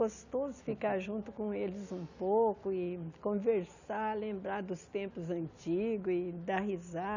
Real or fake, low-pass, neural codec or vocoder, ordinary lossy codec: real; 7.2 kHz; none; none